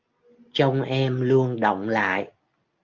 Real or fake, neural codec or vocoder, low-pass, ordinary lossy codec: real; none; 7.2 kHz; Opus, 32 kbps